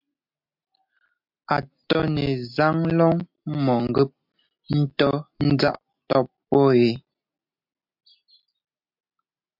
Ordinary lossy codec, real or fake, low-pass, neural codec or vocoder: MP3, 48 kbps; real; 5.4 kHz; none